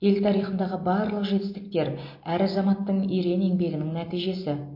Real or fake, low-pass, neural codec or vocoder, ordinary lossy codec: real; 5.4 kHz; none; MP3, 32 kbps